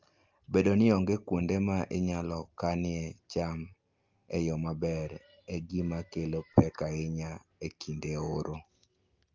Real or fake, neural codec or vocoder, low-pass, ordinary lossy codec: real; none; 7.2 kHz; Opus, 24 kbps